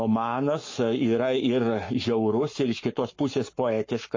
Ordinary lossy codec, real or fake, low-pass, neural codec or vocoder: MP3, 32 kbps; fake; 7.2 kHz; codec, 44.1 kHz, 7.8 kbps, Pupu-Codec